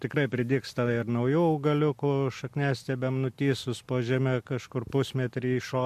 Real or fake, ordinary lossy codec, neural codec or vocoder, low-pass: real; MP3, 64 kbps; none; 14.4 kHz